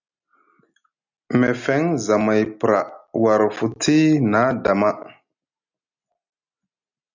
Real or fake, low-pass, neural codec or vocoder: real; 7.2 kHz; none